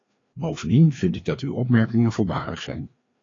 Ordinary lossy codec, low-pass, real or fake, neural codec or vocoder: AAC, 32 kbps; 7.2 kHz; fake; codec, 16 kHz, 2 kbps, FreqCodec, larger model